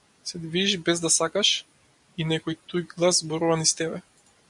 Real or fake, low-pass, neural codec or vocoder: real; 10.8 kHz; none